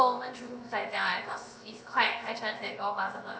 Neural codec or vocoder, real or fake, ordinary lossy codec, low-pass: codec, 16 kHz, 0.7 kbps, FocalCodec; fake; none; none